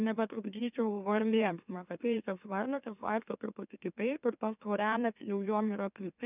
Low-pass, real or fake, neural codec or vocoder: 3.6 kHz; fake; autoencoder, 44.1 kHz, a latent of 192 numbers a frame, MeloTTS